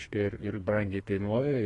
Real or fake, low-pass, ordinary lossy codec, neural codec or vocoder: fake; 10.8 kHz; AAC, 32 kbps; codec, 32 kHz, 1.9 kbps, SNAC